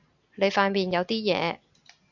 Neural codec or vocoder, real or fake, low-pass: none; real; 7.2 kHz